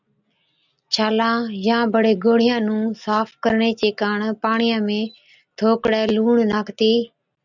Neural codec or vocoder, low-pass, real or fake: none; 7.2 kHz; real